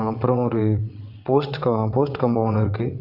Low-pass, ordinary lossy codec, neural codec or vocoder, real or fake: 5.4 kHz; Opus, 64 kbps; vocoder, 22.05 kHz, 80 mel bands, WaveNeXt; fake